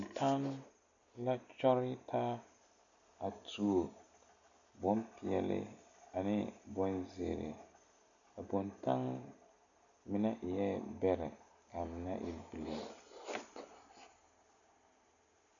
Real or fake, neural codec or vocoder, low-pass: real; none; 7.2 kHz